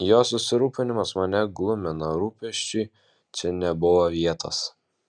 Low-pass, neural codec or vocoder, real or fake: 9.9 kHz; none; real